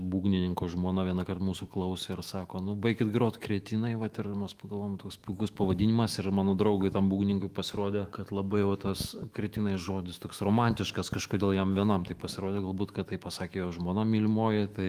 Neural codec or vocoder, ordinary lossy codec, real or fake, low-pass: autoencoder, 48 kHz, 128 numbers a frame, DAC-VAE, trained on Japanese speech; Opus, 32 kbps; fake; 14.4 kHz